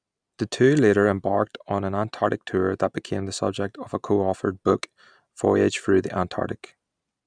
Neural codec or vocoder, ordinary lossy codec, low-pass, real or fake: none; none; 9.9 kHz; real